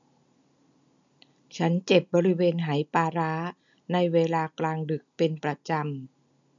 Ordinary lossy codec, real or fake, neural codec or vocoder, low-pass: none; real; none; 7.2 kHz